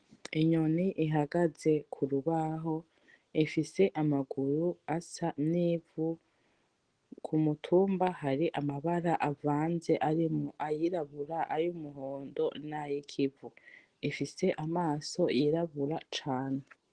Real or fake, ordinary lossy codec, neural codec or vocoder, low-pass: real; Opus, 16 kbps; none; 9.9 kHz